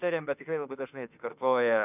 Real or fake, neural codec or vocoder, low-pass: fake; autoencoder, 48 kHz, 32 numbers a frame, DAC-VAE, trained on Japanese speech; 3.6 kHz